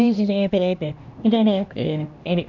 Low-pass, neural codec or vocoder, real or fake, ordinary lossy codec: 7.2 kHz; codec, 16 kHz, 1 kbps, X-Codec, HuBERT features, trained on LibriSpeech; fake; none